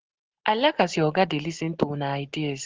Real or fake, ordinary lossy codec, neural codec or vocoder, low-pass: real; Opus, 16 kbps; none; 7.2 kHz